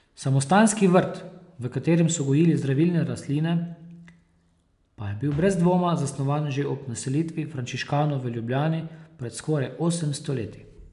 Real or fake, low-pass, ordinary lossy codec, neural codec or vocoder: real; 10.8 kHz; none; none